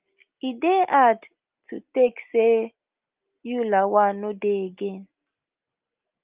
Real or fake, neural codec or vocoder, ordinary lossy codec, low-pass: real; none; Opus, 24 kbps; 3.6 kHz